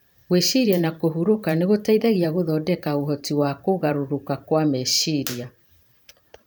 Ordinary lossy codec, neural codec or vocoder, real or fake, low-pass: none; vocoder, 44.1 kHz, 128 mel bands every 512 samples, BigVGAN v2; fake; none